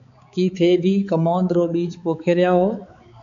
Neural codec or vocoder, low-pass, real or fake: codec, 16 kHz, 4 kbps, X-Codec, HuBERT features, trained on balanced general audio; 7.2 kHz; fake